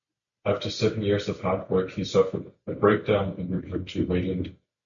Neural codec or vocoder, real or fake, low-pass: none; real; 7.2 kHz